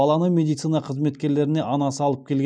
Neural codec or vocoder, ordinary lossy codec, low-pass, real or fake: none; none; 9.9 kHz; real